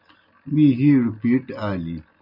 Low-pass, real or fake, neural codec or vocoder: 5.4 kHz; real; none